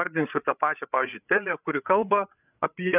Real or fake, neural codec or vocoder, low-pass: fake; codec, 16 kHz, 8 kbps, FreqCodec, larger model; 3.6 kHz